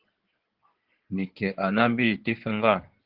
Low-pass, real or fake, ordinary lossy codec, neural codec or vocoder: 5.4 kHz; fake; Opus, 16 kbps; codec, 16 kHz in and 24 kHz out, 2.2 kbps, FireRedTTS-2 codec